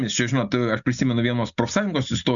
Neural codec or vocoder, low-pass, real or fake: none; 7.2 kHz; real